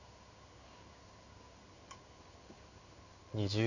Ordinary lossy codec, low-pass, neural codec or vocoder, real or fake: none; 7.2 kHz; codec, 16 kHz in and 24 kHz out, 1 kbps, XY-Tokenizer; fake